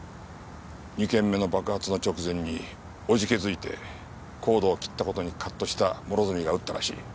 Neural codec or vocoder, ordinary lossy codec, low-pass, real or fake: none; none; none; real